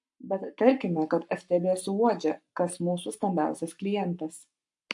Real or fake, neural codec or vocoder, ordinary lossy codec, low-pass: fake; codec, 44.1 kHz, 7.8 kbps, Pupu-Codec; MP3, 64 kbps; 10.8 kHz